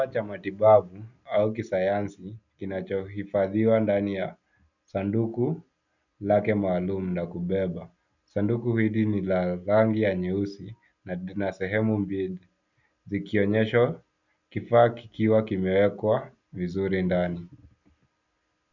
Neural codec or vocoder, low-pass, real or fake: none; 7.2 kHz; real